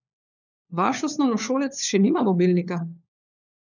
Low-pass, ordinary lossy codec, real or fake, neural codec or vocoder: 7.2 kHz; none; fake; codec, 16 kHz, 4 kbps, FunCodec, trained on LibriTTS, 50 frames a second